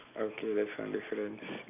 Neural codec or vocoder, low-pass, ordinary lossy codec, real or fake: none; 3.6 kHz; AAC, 24 kbps; real